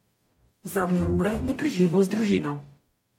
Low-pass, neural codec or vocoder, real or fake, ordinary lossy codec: 19.8 kHz; codec, 44.1 kHz, 0.9 kbps, DAC; fake; MP3, 64 kbps